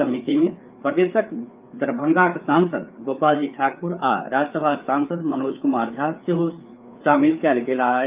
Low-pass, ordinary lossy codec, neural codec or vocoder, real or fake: 3.6 kHz; Opus, 24 kbps; codec, 16 kHz, 4 kbps, FreqCodec, larger model; fake